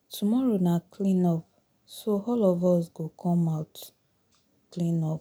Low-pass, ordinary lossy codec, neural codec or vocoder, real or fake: none; none; none; real